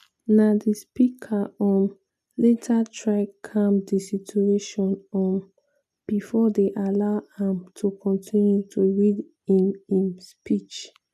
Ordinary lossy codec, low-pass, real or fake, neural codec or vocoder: none; 14.4 kHz; real; none